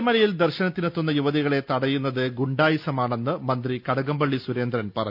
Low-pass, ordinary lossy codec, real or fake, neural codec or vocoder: 5.4 kHz; none; real; none